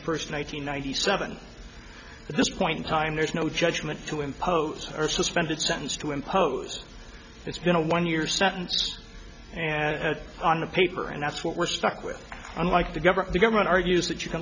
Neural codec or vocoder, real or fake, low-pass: none; real; 7.2 kHz